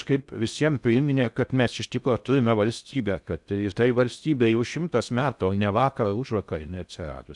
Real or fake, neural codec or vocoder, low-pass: fake; codec, 16 kHz in and 24 kHz out, 0.6 kbps, FocalCodec, streaming, 4096 codes; 10.8 kHz